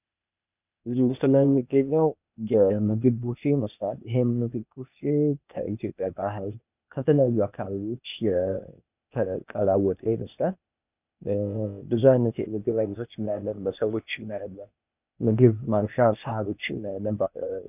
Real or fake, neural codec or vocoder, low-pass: fake; codec, 16 kHz, 0.8 kbps, ZipCodec; 3.6 kHz